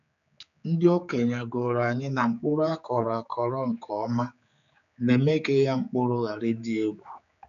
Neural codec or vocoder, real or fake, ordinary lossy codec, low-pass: codec, 16 kHz, 4 kbps, X-Codec, HuBERT features, trained on general audio; fake; none; 7.2 kHz